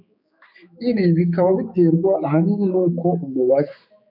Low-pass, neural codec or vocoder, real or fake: 5.4 kHz; codec, 16 kHz, 4 kbps, X-Codec, HuBERT features, trained on general audio; fake